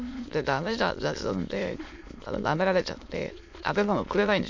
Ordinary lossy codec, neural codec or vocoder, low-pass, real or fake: MP3, 48 kbps; autoencoder, 22.05 kHz, a latent of 192 numbers a frame, VITS, trained on many speakers; 7.2 kHz; fake